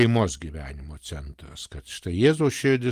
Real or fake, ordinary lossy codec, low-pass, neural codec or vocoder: real; Opus, 24 kbps; 14.4 kHz; none